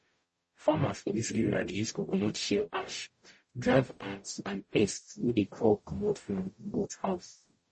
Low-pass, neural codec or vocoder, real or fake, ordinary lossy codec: 10.8 kHz; codec, 44.1 kHz, 0.9 kbps, DAC; fake; MP3, 32 kbps